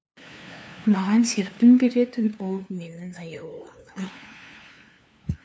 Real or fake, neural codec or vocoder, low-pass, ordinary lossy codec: fake; codec, 16 kHz, 2 kbps, FunCodec, trained on LibriTTS, 25 frames a second; none; none